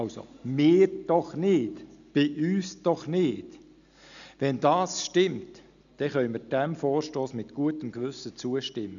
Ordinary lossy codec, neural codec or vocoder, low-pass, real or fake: none; none; 7.2 kHz; real